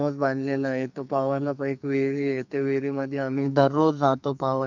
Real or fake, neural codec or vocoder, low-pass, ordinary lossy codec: fake; codec, 44.1 kHz, 2.6 kbps, SNAC; 7.2 kHz; none